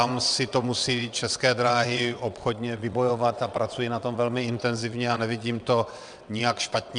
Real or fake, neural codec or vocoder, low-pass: fake; vocoder, 22.05 kHz, 80 mel bands, WaveNeXt; 9.9 kHz